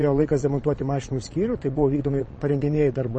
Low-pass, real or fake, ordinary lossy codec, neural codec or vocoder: 9.9 kHz; fake; MP3, 32 kbps; vocoder, 22.05 kHz, 80 mel bands, Vocos